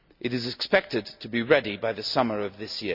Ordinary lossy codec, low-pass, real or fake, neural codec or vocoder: none; 5.4 kHz; real; none